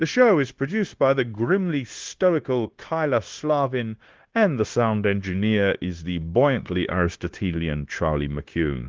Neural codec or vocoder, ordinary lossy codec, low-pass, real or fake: codec, 16 kHz, 0.9 kbps, LongCat-Audio-Codec; Opus, 16 kbps; 7.2 kHz; fake